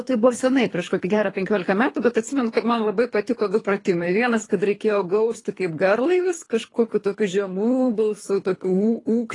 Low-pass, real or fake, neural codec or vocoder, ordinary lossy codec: 10.8 kHz; fake; codec, 24 kHz, 3 kbps, HILCodec; AAC, 32 kbps